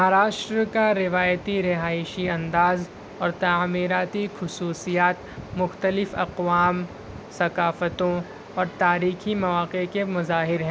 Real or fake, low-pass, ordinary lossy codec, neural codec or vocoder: real; none; none; none